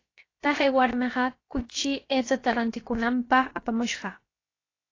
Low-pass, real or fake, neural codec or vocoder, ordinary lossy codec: 7.2 kHz; fake; codec, 16 kHz, about 1 kbps, DyCAST, with the encoder's durations; AAC, 32 kbps